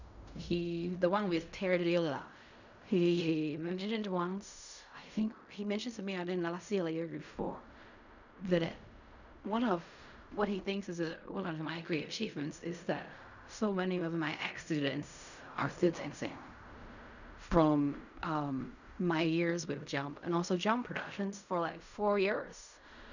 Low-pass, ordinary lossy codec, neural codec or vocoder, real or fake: 7.2 kHz; none; codec, 16 kHz in and 24 kHz out, 0.4 kbps, LongCat-Audio-Codec, fine tuned four codebook decoder; fake